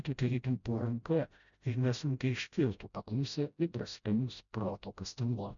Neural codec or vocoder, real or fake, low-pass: codec, 16 kHz, 0.5 kbps, FreqCodec, smaller model; fake; 7.2 kHz